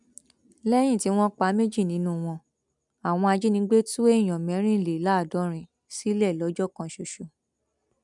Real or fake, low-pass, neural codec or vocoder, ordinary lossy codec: real; 10.8 kHz; none; none